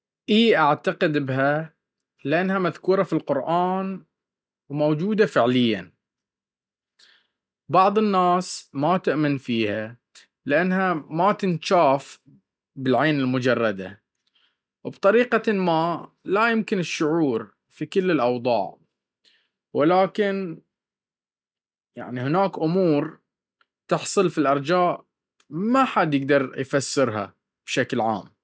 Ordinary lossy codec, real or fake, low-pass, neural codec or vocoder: none; real; none; none